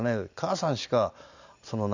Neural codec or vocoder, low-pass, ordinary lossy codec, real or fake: none; 7.2 kHz; none; real